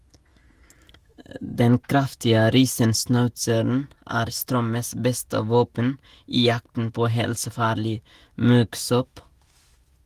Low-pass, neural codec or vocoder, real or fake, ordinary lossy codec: 14.4 kHz; autoencoder, 48 kHz, 128 numbers a frame, DAC-VAE, trained on Japanese speech; fake; Opus, 24 kbps